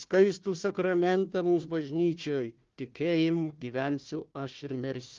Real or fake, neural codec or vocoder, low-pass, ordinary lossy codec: fake; codec, 16 kHz, 1 kbps, FunCodec, trained on Chinese and English, 50 frames a second; 7.2 kHz; Opus, 32 kbps